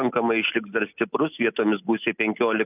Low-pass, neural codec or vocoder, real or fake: 3.6 kHz; none; real